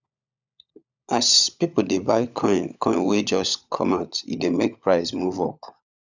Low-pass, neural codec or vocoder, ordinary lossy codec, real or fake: 7.2 kHz; codec, 16 kHz, 16 kbps, FunCodec, trained on LibriTTS, 50 frames a second; none; fake